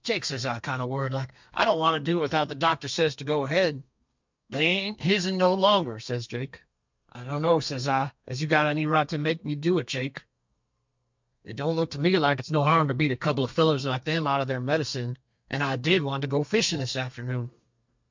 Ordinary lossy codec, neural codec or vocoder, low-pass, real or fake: MP3, 64 kbps; codec, 32 kHz, 1.9 kbps, SNAC; 7.2 kHz; fake